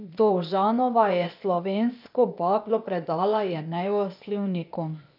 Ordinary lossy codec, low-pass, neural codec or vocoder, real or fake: none; 5.4 kHz; codec, 16 kHz, 0.8 kbps, ZipCodec; fake